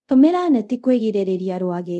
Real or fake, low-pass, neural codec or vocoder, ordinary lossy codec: fake; none; codec, 24 kHz, 0.5 kbps, DualCodec; none